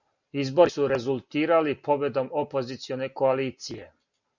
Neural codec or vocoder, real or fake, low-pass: none; real; 7.2 kHz